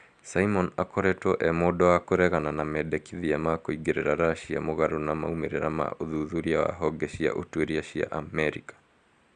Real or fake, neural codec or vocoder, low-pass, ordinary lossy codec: real; none; 9.9 kHz; Opus, 64 kbps